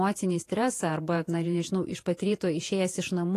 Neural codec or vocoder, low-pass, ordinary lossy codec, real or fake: vocoder, 48 kHz, 128 mel bands, Vocos; 14.4 kHz; AAC, 48 kbps; fake